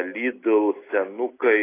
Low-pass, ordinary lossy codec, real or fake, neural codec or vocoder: 3.6 kHz; AAC, 16 kbps; real; none